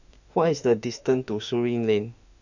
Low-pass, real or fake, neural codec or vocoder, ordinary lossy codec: 7.2 kHz; fake; autoencoder, 48 kHz, 32 numbers a frame, DAC-VAE, trained on Japanese speech; none